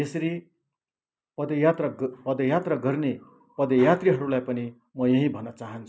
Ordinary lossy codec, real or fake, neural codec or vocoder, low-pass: none; real; none; none